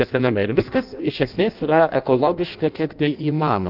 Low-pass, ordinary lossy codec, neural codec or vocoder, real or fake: 5.4 kHz; Opus, 24 kbps; codec, 16 kHz in and 24 kHz out, 0.6 kbps, FireRedTTS-2 codec; fake